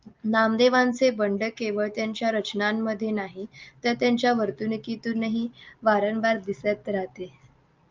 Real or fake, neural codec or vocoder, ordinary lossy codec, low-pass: real; none; Opus, 24 kbps; 7.2 kHz